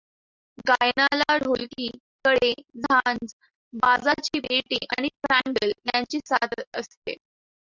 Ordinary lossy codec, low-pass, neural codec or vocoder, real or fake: Opus, 64 kbps; 7.2 kHz; none; real